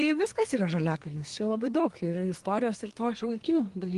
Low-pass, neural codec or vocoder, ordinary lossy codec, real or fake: 10.8 kHz; codec, 24 kHz, 1 kbps, SNAC; Opus, 24 kbps; fake